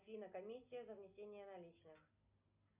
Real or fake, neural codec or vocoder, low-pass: real; none; 3.6 kHz